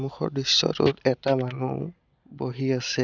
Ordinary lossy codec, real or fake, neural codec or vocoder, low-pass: none; real; none; 7.2 kHz